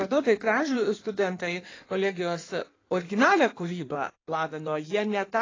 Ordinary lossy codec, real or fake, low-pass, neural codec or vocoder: AAC, 32 kbps; fake; 7.2 kHz; codec, 16 kHz in and 24 kHz out, 1.1 kbps, FireRedTTS-2 codec